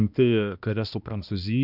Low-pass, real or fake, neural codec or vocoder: 5.4 kHz; fake; codec, 16 kHz, 1 kbps, X-Codec, HuBERT features, trained on balanced general audio